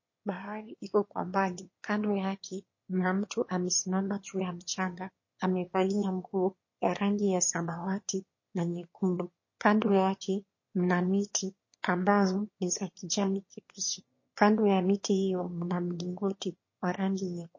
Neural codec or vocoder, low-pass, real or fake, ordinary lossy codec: autoencoder, 22.05 kHz, a latent of 192 numbers a frame, VITS, trained on one speaker; 7.2 kHz; fake; MP3, 32 kbps